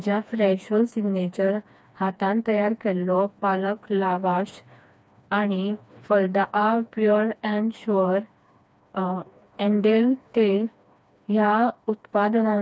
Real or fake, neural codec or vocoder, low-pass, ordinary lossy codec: fake; codec, 16 kHz, 2 kbps, FreqCodec, smaller model; none; none